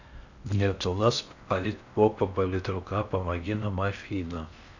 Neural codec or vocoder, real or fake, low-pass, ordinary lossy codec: codec, 16 kHz in and 24 kHz out, 0.6 kbps, FocalCodec, streaming, 4096 codes; fake; 7.2 kHz; none